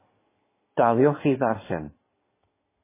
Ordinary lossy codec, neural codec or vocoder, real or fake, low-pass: MP3, 16 kbps; codec, 16 kHz in and 24 kHz out, 2.2 kbps, FireRedTTS-2 codec; fake; 3.6 kHz